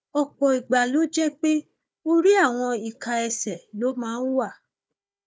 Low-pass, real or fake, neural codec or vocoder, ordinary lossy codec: none; fake; codec, 16 kHz, 4 kbps, FunCodec, trained on Chinese and English, 50 frames a second; none